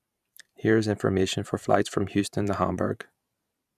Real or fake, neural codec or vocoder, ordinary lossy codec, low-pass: fake; vocoder, 44.1 kHz, 128 mel bands every 512 samples, BigVGAN v2; none; 14.4 kHz